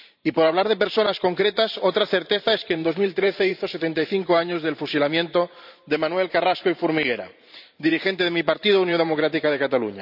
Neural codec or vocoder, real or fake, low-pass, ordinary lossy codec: vocoder, 44.1 kHz, 128 mel bands every 512 samples, BigVGAN v2; fake; 5.4 kHz; none